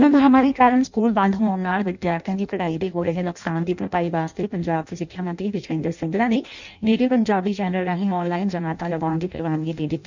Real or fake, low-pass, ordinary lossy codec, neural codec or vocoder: fake; 7.2 kHz; none; codec, 16 kHz in and 24 kHz out, 0.6 kbps, FireRedTTS-2 codec